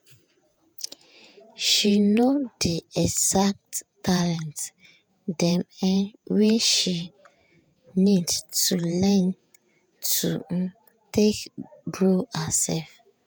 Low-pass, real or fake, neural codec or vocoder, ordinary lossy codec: none; fake; vocoder, 48 kHz, 128 mel bands, Vocos; none